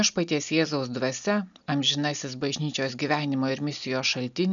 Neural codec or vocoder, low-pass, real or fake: none; 7.2 kHz; real